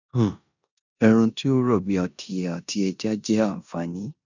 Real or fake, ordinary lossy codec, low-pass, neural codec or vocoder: fake; none; 7.2 kHz; codec, 16 kHz in and 24 kHz out, 0.9 kbps, LongCat-Audio-Codec, four codebook decoder